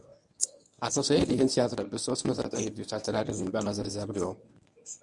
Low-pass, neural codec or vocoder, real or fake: 10.8 kHz; codec, 24 kHz, 0.9 kbps, WavTokenizer, medium speech release version 1; fake